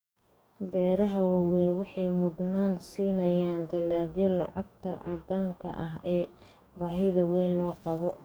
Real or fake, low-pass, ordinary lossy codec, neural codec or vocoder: fake; none; none; codec, 44.1 kHz, 2.6 kbps, DAC